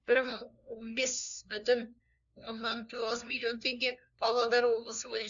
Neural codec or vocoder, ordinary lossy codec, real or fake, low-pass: codec, 16 kHz, 1 kbps, FunCodec, trained on LibriTTS, 50 frames a second; none; fake; 7.2 kHz